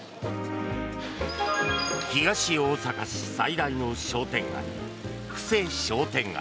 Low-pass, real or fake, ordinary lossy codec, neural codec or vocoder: none; real; none; none